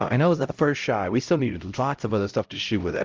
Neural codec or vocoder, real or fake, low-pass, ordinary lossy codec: codec, 16 kHz, 0.5 kbps, X-Codec, HuBERT features, trained on LibriSpeech; fake; 7.2 kHz; Opus, 32 kbps